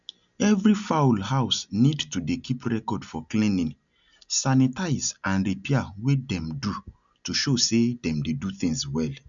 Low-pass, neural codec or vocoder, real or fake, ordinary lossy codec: 7.2 kHz; none; real; none